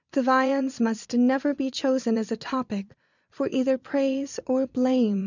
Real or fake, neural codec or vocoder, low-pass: fake; vocoder, 22.05 kHz, 80 mel bands, Vocos; 7.2 kHz